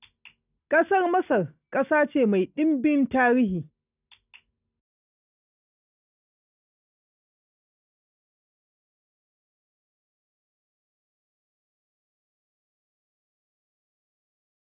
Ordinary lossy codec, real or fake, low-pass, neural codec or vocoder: none; real; 3.6 kHz; none